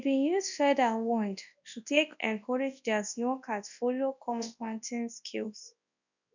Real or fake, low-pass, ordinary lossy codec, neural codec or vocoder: fake; 7.2 kHz; none; codec, 24 kHz, 0.9 kbps, WavTokenizer, large speech release